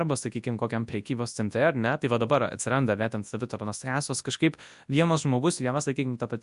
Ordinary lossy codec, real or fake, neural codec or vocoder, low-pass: AAC, 96 kbps; fake; codec, 24 kHz, 0.9 kbps, WavTokenizer, large speech release; 10.8 kHz